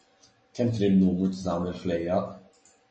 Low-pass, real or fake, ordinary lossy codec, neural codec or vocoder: 9.9 kHz; real; MP3, 32 kbps; none